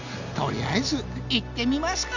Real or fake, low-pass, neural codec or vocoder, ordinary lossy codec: real; 7.2 kHz; none; none